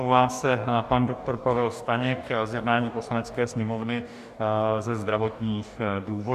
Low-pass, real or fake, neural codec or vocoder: 14.4 kHz; fake; codec, 44.1 kHz, 2.6 kbps, DAC